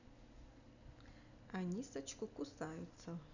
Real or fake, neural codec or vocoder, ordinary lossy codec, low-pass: real; none; none; 7.2 kHz